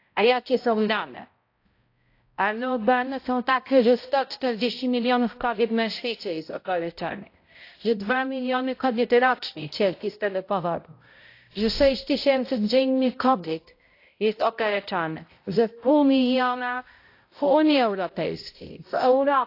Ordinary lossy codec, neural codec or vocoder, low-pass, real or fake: AAC, 32 kbps; codec, 16 kHz, 0.5 kbps, X-Codec, HuBERT features, trained on balanced general audio; 5.4 kHz; fake